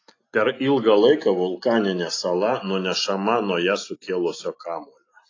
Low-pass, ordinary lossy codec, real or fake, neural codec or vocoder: 7.2 kHz; AAC, 32 kbps; real; none